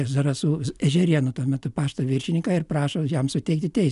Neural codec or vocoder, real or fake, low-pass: none; real; 10.8 kHz